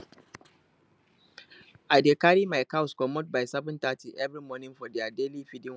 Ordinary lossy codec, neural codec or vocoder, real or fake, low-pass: none; none; real; none